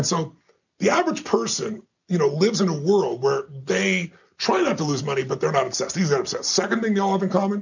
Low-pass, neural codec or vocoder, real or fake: 7.2 kHz; none; real